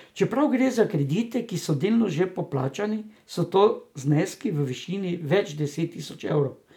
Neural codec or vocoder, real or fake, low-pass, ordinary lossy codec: none; real; 19.8 kHz; none